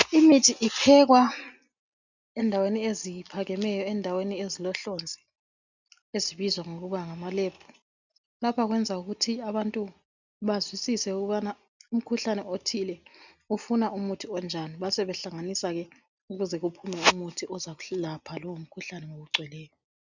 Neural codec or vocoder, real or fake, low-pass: none; real; 7.2 kHz